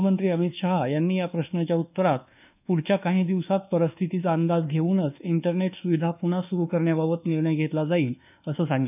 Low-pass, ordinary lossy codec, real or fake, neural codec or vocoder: 3.6 kHz; none; fake; codec, 24 kHz, 1.2 kbps, DualCodec